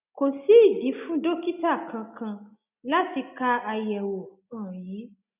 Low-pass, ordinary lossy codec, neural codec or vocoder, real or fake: 3.6 kHz; none; none; real